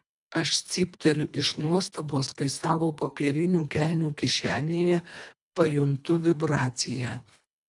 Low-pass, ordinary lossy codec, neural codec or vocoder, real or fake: 10.8 kHz; MP3, 96 kbps; codec, 24 kHz, 1.5 kbps, HILCodec; fake